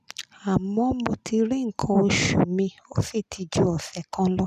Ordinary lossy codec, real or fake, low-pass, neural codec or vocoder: none; real; 14.4 kHz; none